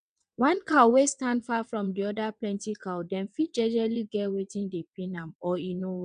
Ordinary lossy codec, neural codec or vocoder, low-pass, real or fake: none; vocoder, 22.05 kHz, 80 mel bands, WaveNeXt; 9.9 kHz; fake